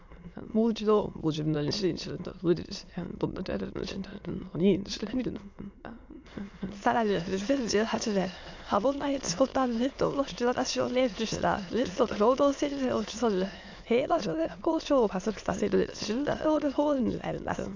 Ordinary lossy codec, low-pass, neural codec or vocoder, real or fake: AAC, 48 kbps; 7.2 kHz; autoencoder, 22.05 kHz, a latent of 192 numbers a frame, VITS, trained on many speakers; fake